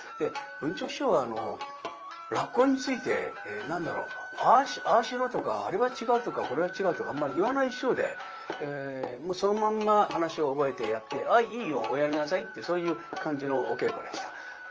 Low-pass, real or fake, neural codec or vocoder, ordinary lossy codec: 7.2 kHz; fake; vocoder, 44.1 kHz, 128 mel bands, Pupu-Vocoder; Opus, 24 kbps